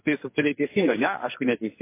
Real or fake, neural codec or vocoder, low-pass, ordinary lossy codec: fake; codec, 44.1 kHz, 2.6 kbps, SNAC; 3.6 kHz; MP3, 24 kbps